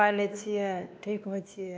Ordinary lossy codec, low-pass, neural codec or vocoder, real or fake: none; none; codec, 16 kHz, 2 kbps, X-Codec, WavLM features, trained on Multilingual LibriSpeech; fake